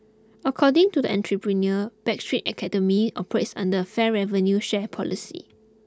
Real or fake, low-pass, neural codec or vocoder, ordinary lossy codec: real; none; none; none